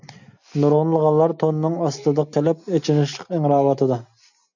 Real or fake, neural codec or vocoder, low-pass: real; none; 7.2 kHz